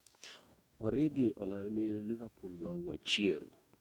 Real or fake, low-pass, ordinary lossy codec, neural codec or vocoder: fake; 19.8 kHz; none; codec, 44.1 kHz, 2.6 kbps, DAC